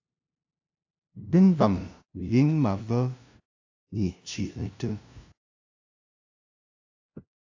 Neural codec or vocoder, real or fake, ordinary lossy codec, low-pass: codec, 16 kHz, 0.5 kbps, FunCodec, trained on LibriTTS, 25 frames a second; fake; AAC, 48 kbps; 7.2 kHz